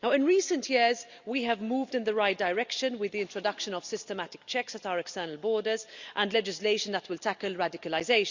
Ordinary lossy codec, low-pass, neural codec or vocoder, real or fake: Opus, 64 kbps; 7.2 kHz; none; real